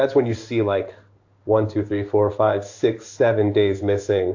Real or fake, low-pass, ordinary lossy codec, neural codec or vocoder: fake; 7.2 kHz; AAC, 48 kbps; codec, 16 kHz in and 24 kHz out, 1 kbps, XY-Tokenizer